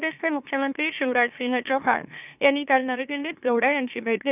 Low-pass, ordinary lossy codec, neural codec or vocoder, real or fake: 3.6 kHz; none; autoencoder, 44.1 kHz, a latent of 192 numbers a frame, MeloTTS; fake